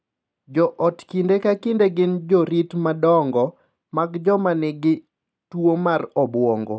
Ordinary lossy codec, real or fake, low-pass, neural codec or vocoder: none; real; none; none